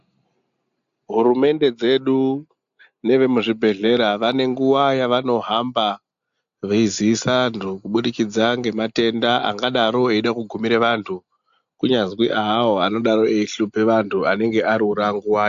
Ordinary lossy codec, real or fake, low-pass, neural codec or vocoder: AAC, 64 kbps; real; 7.2 kHz; none